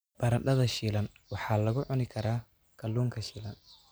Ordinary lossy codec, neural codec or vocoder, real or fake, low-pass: none; none; real; none